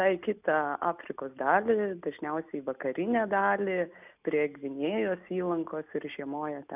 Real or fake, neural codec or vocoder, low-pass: real; none; 3.6 kHz